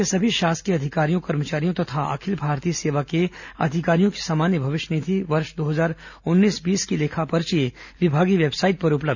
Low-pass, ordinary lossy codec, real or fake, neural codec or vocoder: 7.2 kHz; none; real; none